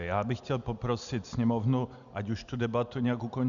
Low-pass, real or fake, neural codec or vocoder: 7.2 kHz; real; none